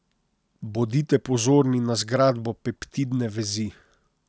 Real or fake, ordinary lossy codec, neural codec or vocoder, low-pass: real; none; none; none